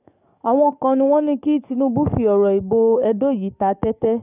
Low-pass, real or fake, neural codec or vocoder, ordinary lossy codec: 3.6 kHz; fake; codec, 44.1 kHz, 7.8 kbps, DAC; none